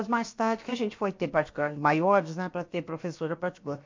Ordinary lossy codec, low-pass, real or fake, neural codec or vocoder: MP3, 48 kbps; 7.2 kHz; fake; codec, 16 kHz, about 1 kbps, DyCAST, with the encoder's durations